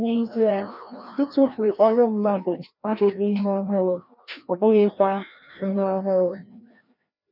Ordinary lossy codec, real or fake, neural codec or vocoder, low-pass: none; fake; codec, 16 kHz, 1 kbps, FreqCodec, larger model; 5.4 kHz